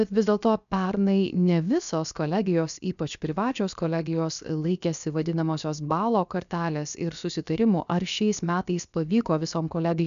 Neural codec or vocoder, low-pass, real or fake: codec, 16 kHz, 0.7 kbps, FocalCodec; 7.2 kHz; fake